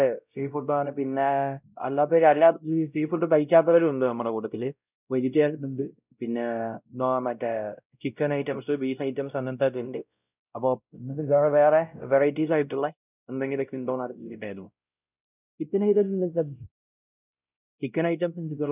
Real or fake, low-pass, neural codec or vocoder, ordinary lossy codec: fake; 3.6 kHz; codec, 16 kHz, 0.5 kbps, X-Codec, WavLM features, trained on Multilingual LibriSpeech; none